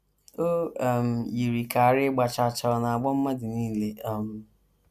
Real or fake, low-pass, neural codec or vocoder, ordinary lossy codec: real; 14.4 kHz; none; none